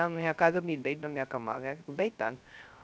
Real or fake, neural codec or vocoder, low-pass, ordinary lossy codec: fake; codec, 16 kHz, 0.3 kbps, FocalCodec; none; none